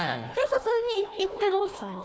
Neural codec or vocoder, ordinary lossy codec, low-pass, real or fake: codec, 16 kHz, 1 kbps, FunCodec, trained on Chinese and English, 50 frames a second; none; none; fake